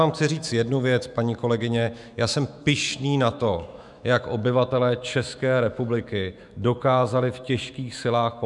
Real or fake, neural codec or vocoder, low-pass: fake; autoencoder, 48 kHz, 128 numbers a frame, DAC-VAE, trained on Japanese speech; 10.8 kHz